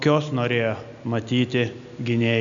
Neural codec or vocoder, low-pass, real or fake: none; 7.2 kHz; real